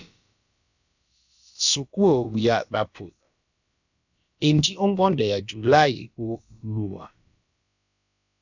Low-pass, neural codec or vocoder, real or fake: 7.2 kHz; codec, 16 kHz, about 1 kbps, DyCAST, with the encoder's durations; fake